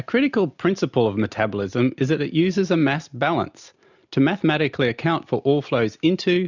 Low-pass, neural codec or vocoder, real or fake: 7.2 kHz; none; real